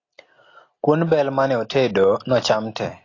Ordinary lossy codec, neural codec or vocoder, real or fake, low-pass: AAC, 32 kbps; none; real; 7.2 kHz